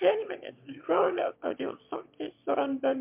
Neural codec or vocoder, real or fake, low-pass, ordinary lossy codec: autoencoder, 22.05 kHz, a latent of 192 numbers a frame, VITS, trained on one speaker; fake; 3.6 kHz; MP3, 32 kbps